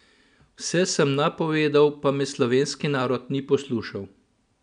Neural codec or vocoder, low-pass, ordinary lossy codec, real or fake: none; 9.9 kHz; none; real